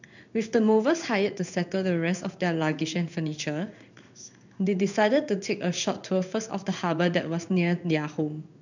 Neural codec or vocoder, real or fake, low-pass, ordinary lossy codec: codec, 16 kHz in and 24 kHz out, 1 kbps, XY-Tokenizer; fake; 7.2 kHz; none